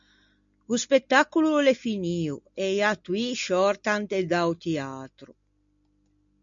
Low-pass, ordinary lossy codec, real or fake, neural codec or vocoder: 7.2 kHz; MP3, 64 kbps; real; none